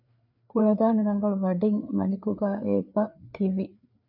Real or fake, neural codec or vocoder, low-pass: fake; codec, 16 kHz, 4 kbps, FreqCodec, larger model; 5.4 kHz